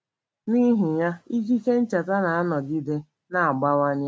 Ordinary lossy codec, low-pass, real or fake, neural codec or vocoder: none; none; real; none